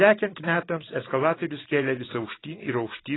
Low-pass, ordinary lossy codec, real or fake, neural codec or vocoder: 7.2 kHz; AAC, 16 kbps; real; none